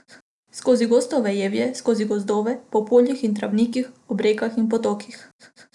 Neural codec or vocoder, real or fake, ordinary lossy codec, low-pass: none; real; none; 10.8 kHz